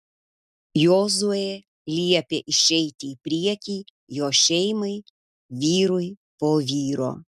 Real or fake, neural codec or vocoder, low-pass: real; none; 14.4 kHz